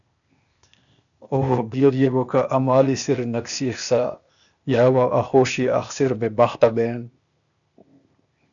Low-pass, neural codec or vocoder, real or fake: 7.2 kHz; codec, 16 kHz, 0.8 kbps, ZipCodec; fake